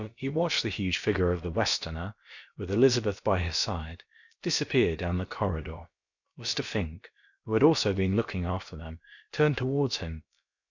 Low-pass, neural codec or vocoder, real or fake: 7.2 kHz; codec, 16 kHz, about 1 kbps, DyCAST, with the encoder's durations; fake